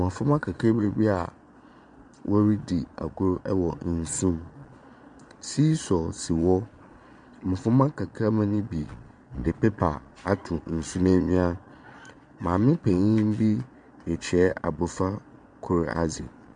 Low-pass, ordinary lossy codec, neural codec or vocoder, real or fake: 9.9 kHz; MP3, 64 kbps; vocoder, 22.05 kHz, 80 mel bands, Vocos; fake